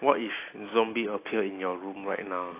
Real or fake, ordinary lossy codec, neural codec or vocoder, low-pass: real; AAC, 24 kbps; none; 3.6 kHz